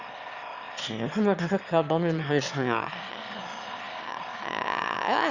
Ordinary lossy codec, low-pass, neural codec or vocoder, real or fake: Opus, 64 kbps; 7.2 kHz; autoencoder, 22.05 kHz, a latent of 192 numbers a frame, VITS, trained on one speaker; fake